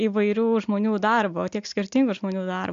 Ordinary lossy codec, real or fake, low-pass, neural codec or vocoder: AAC, 64 kbps; real; 7.2 kHz; none